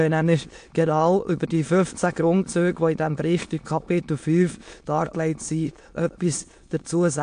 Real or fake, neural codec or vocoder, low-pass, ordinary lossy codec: fake; autoencoder, 22.05 kHz, a latent of 192 numbers a frame, VITS, trained on many speakers; 9.9 kHz; AAC, 64 kbps